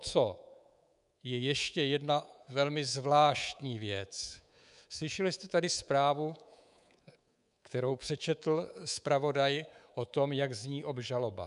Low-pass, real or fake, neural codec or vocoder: 10.8 kHz; fake; codec, 24 kHz, 3.1 kbps, DualCodec